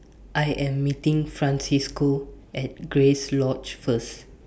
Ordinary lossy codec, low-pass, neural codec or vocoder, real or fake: none; none; none; real